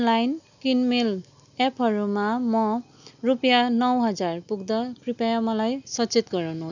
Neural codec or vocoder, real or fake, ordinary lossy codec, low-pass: none; real; none; 7.2 kHz